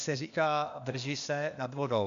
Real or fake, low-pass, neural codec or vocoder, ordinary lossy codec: fake; 7.2 kHz; codec, 16 kHz, 0.8 kbps, ZipCodec; MP3, 64 kbps